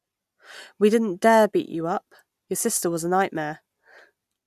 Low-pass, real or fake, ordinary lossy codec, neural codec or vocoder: 14.4 kHz; real; none; none